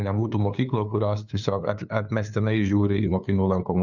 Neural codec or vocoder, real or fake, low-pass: codec, 16 kHz, 2 kbps, FunCodec, trained on LibriTTS, 25 frames a second; fake; 7.2 kHz